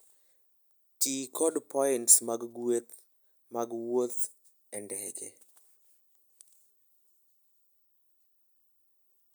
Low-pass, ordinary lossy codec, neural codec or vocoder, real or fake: none; none; none; real